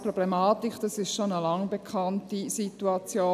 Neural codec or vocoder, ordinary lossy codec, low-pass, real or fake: none; none; 14.4 kHz; real